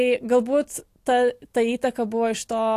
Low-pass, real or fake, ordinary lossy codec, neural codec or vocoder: 14.4 kHz; real; AAC, 64 kbps; none